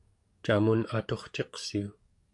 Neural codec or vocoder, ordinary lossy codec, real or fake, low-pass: codec, 44.1 kHz, 7.8 kbps, DAC; AAC, 64 kbps; fake; 10.8 kHz